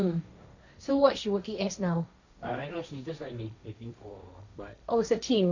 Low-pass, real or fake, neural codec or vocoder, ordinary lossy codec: 7.2 kHz; fake; codec, 16 kHz, 1.1 kbps, Voila-Tokenizer; none